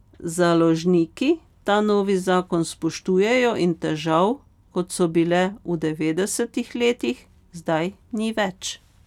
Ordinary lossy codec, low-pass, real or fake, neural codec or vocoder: none; 19.8 kHz; real; none